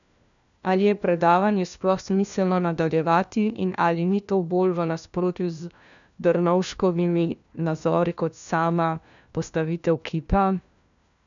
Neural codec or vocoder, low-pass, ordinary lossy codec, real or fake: codec, 16 kHz, 1 kbps, FunCodec, trained on LibriTTS, 50 frames a second; 7.2 kHz; none; fake